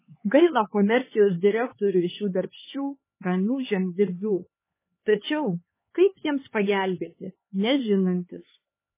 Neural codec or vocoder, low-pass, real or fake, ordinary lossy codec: codec, 16 kHz, 4 kbps, X-Codec, HuBERT features, trained on LibriSpeech; 3.6 kHz; fake; MP3, 16 kbps